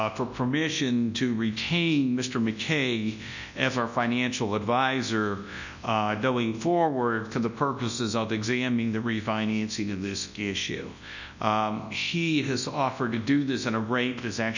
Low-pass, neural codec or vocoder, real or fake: 7.2 kHz; codec, 24 kHz, 0.9 kbps, WavTokenizer, large speech release; fake